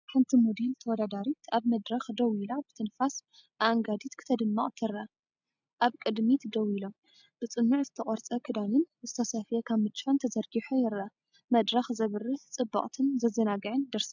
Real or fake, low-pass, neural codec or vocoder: real; 7.2 kHz; none